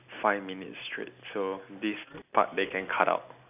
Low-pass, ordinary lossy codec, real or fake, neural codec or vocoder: 3.6 kHz; none; real; none